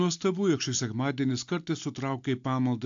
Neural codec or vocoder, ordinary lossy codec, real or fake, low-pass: none; AAC, 64 kbps; real; 7.2 kHz